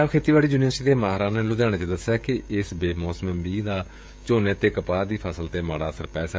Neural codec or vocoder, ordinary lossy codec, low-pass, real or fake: codec, 16 kHz, 16 kbps, FreqCodec, smaller model; none; none; fake